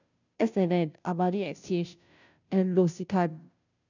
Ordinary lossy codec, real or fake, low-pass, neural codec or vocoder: none; fake; 7.2 kHz; codec, 16 kHz, 0.5 kbps, FunCodec, trained on Chinese and English, 25 frames a second